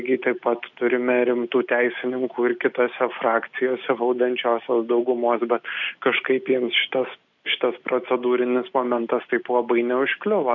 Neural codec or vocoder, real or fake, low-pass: none; real; 7.2 kHz